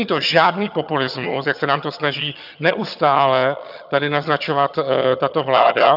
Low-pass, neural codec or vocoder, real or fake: 5.4 kHz; vocoder, 22.05 kHz, 80 mel bands, HiFi-GAN; fake